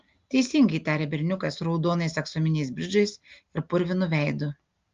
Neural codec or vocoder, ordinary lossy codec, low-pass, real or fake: none; Opus, 32 kbps; 7.2 kHz; real